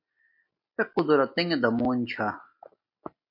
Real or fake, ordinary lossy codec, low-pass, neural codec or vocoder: real; MP3, 32 kbps; 5.4 kHz; none